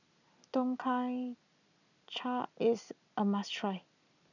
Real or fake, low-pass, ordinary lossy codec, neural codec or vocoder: real; 7.2 kHz; none; none